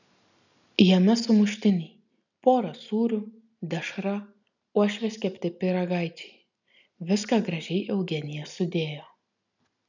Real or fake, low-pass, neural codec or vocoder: real; 7.2 kHz; none